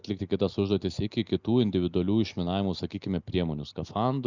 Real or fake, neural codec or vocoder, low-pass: real; none; 7.2 kHz